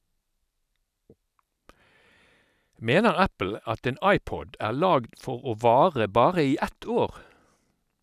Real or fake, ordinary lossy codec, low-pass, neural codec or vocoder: real; none; 14.4 kHz; none